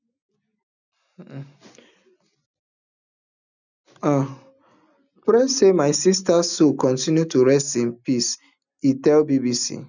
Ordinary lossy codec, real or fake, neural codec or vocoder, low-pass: none; real; none; 7.2 kHz